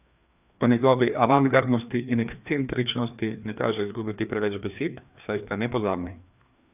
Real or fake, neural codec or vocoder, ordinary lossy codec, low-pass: fake; codec, 16 kHz, 2 kbps, FreqCodec, larger model; none; 3.6 kHz